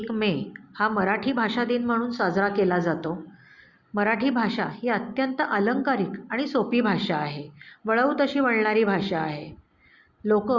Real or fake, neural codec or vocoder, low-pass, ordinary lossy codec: real; none; 7.2 kHz; Opus, 64 kbps